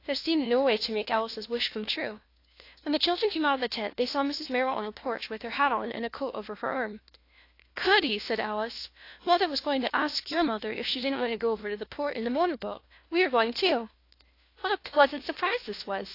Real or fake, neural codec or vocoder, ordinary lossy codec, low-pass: fake; codec, 16 kHz, 1 kbps, FunCodec, trained on LibriTTS, 50 frames a second; AAC, 32 kbps; 5.4 kHz